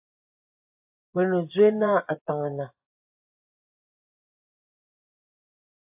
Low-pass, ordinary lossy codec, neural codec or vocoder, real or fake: 3.6 kHz; AAC, 32 kbps; vocoder, 24 kHz, 100 mel bands, Vocos; fake